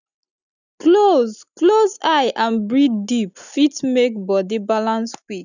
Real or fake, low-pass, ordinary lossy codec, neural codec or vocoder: real; 7.2 kHz; none; none